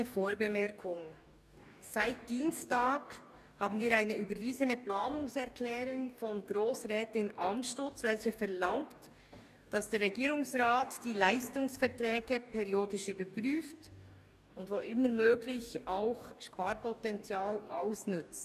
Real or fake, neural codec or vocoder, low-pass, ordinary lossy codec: fake; codec, 44.1 kHz, 2.6 kbps, DAC; 14.4 kHz; none